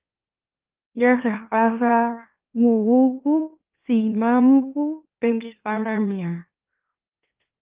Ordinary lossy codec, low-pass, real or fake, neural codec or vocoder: Opus, 32 kbps; 3.6 kHz; fake; autoencoder, 44.1 kHz, a latent of 192 numbers a frame, MeloTTS